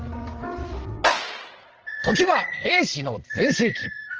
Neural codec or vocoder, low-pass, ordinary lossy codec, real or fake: codec, 16 kHz, 8 kbps, FreqCodec, larger model; 7.2 kHz; Opus, 16 kbps; fake